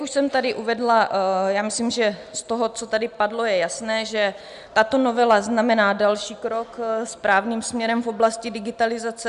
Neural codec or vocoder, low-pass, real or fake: none; 10.8 kHz; real